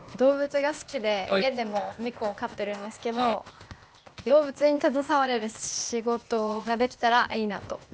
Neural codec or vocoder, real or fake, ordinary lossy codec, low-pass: codec, 16 kHz, 0.8 kbps, ZipCodec; fake; none; none